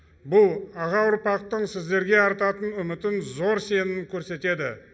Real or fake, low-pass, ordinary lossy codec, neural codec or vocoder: real; none; none; none